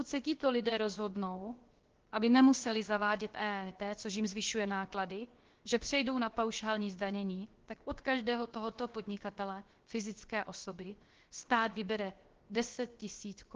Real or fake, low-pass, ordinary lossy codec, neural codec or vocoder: fake; 7.2 kHz; Opus, 16 kbps; codec, 16 kHz, about 1 kbps, DyCAST, with the encoder's durations